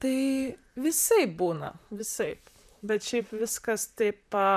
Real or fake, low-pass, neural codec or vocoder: fake; 14.4 kHz; vocoder, 44.1 kHz, 128 mel bands, Pupu-Vocoder